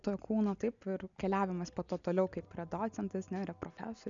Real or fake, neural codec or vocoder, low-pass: real; none; 7.2 kHz